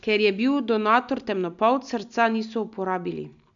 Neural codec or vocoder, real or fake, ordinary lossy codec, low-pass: none; real; none; 7.2 kHz